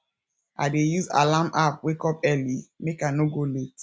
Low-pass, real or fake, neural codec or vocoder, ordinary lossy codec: none; real; none; none